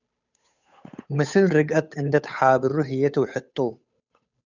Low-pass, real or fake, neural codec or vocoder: 7.2 kHz; fake; codec, 16 kHz, 8 kbps, FunCodec, trained on Chinese and English, 25 frames a second